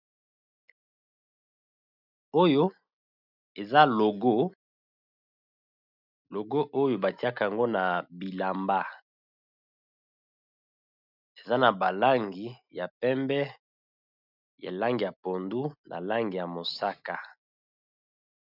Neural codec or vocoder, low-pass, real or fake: none; 5.4 kHz; real